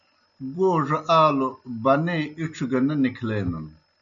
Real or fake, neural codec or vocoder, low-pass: real; none; 7.2 kHz